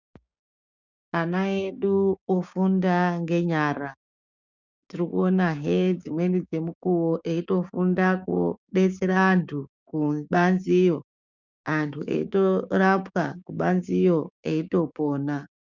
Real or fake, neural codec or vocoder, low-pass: fake; codec, 16 kHz, 6 kbps, DAC; 7.2 kHz